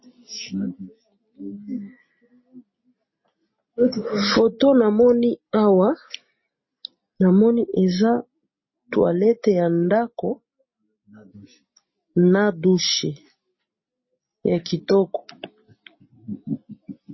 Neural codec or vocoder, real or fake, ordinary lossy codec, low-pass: none; real; MP3, 24 kbps; 7.2 kHz